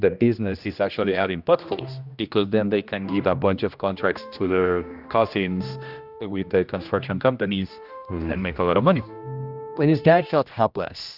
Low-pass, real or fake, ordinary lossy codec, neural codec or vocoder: 5.4 kHz; fake; Opus, 64 kbps; codec, 16 kHz, 1 kbps, X-Codec, HuBERT features, trained on general audio